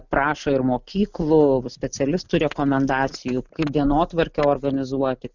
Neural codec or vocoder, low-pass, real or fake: none; 7.2 kHz; real